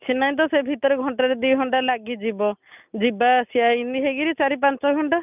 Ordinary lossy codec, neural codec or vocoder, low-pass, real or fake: none; none; 3.6 kHz; real